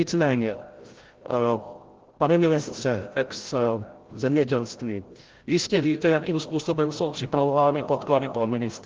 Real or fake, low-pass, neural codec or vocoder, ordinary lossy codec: fake; 7.2 kHz; codec, 16 kHz, 0.5 kbps, FreqCodec, larger model; Opus, 16 kbps